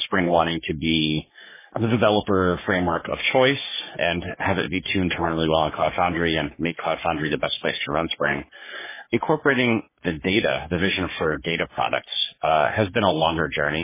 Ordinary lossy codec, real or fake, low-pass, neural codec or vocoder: MP3, 16 kbps; fake; 3.6 kHz; codec, 44.1 kHz, 3.4 kbps, Pupu-Codec